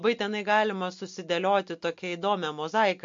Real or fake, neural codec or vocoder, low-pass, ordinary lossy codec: real; none; 7.2 kHz; MP3, 48 kbps